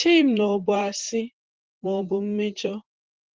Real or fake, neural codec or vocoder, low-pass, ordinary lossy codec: fake; vocoder, 44.1 kHz, 128 mel bands, Pupu-Vocoder; 7.2 kHz; Opus, 16 kbps